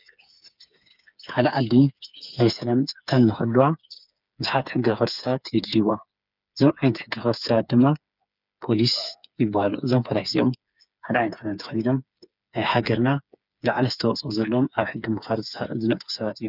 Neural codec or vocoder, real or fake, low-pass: codec, 16 kHz, 4 kbps, FreqCodec, smaller model; fake; 5.4 kHz